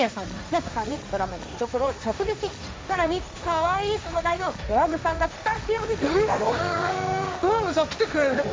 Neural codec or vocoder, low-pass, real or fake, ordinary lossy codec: codec, 16 kHz, 1.1 kbps, Voila-Tokenizer; none; fake; none